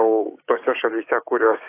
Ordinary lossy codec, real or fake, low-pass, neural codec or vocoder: AAC, 24 kbps; fake; 3.6 kHz; codec, 16 kHz, 6 kbps, DAC